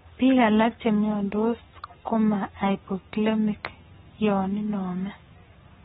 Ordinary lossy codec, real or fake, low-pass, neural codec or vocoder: AAC, 16 kbps; fake; 19.8 kHz; codec, 44.1 kHz, 7.8 kbps, Pupu-Codec